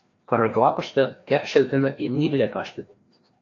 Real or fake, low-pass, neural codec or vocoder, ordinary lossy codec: fake; 7.2 kHz; codec, 16 kHz, 1 kbps, FreqCodec, larger model; AAC, 48 kbps